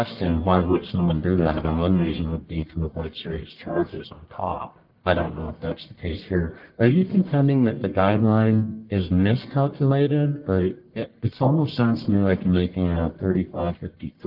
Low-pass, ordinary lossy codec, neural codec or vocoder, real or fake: 5.4 kHz; Opus, 32 kbps; codec, 44.1 kHz, 1.7 kbps, Pupu-Codec; fake